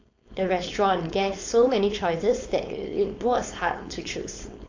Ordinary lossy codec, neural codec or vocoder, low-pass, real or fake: AAC, 48 kbps; codec, 16 kHz, 4.8 kbps, FACodec; 7.2 kHz; fake